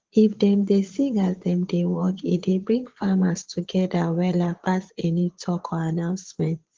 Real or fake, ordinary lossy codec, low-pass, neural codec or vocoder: fake; Opus, 16 kbps; 7.2 kHz; vocoder, 22.05 kHz, 80 mel bands, Vocos